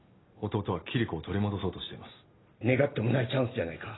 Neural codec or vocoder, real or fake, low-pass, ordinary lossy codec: none; real; 7.2 kHz; AAC, 16 kbps